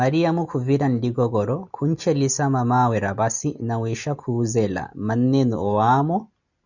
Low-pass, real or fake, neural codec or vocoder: 7.2 kHz; real; none